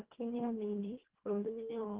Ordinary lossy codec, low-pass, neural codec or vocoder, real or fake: Opus, 16 kbps; 3.6 kHz; codec, 24 kHz, 1.5 kbps, HILCodec; fake